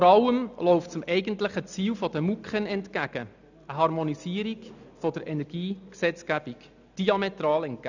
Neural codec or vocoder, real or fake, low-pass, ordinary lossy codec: none; real; 7.2 kHz; none